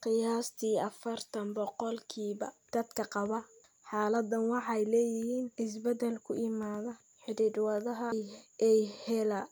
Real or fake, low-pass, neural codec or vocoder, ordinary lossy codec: real; none; none; none